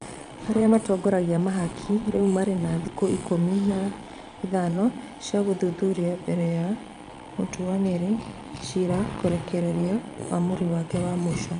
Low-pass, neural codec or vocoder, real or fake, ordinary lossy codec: 9.9 kHz; vocoder, 22.05 kHz, 80 mel bands, Vocos; fake; none